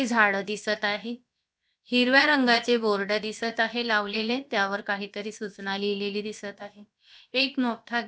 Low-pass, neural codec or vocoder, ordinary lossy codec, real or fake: none; codec, 16 kHz, about 1 kbps, DyCAST, with the encoder's durations; none; fake